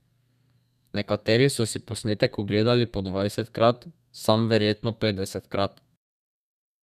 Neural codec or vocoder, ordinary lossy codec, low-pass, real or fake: codec, 32 kHz, 1.9 kbps, SNAC; none; 14.4 kHz; fake